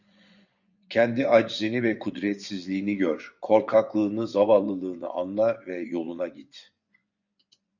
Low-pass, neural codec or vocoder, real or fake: 7.2 kHz; vocoder, 24 kHz, 100 mel bands, Vocos; fake